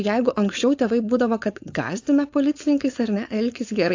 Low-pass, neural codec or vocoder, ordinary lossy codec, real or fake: 7.2 kHz; codec, 16 kHz, 4.8 kbps, FACodec; AAC, 48 kbps; fake